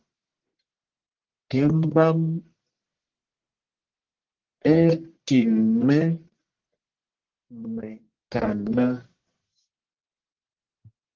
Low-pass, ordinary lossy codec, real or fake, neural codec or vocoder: 7.2 kHz; Opus, 16 kbps; fake; codec, 44.1 kHz, 1.7 kbps, Pupu-Codec